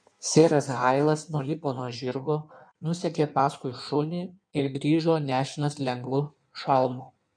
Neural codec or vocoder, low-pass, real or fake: codec, 16 kHz in and 24 kHz out, 1.1 kbps, FireRedTTS-2 codec; 9.9 kHz; fake